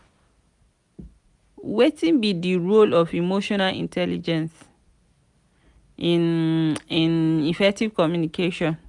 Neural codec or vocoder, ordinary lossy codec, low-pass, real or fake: none; none; 10.8 kHz; real